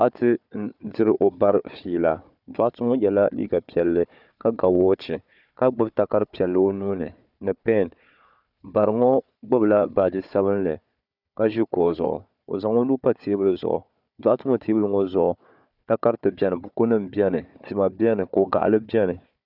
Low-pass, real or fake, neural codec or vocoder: 5.4 kHz; fake; codec, 16 kHz, 4 kbps, FunCodec, trained on Chinese and English, 50 frames a second